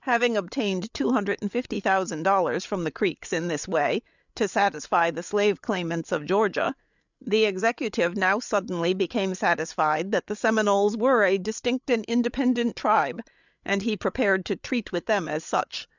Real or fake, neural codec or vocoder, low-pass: fake; codec, 16 kHz, 8 kbps, FreqCodec, larger model; 7.2 kHz